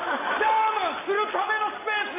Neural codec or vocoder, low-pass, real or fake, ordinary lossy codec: none; 3.6 kHz; real; AAC, 16 kbps